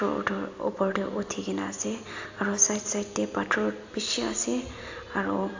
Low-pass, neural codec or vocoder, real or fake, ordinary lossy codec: 7.2 kHz; none; real; AAC, 48 kbps